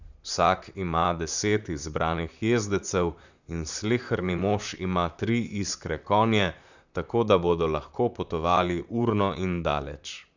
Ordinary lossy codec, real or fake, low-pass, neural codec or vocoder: none; fake; 7.2 kHz; vocoder, 44.1 kHz, 128 mel bands, Pupu-Vocoder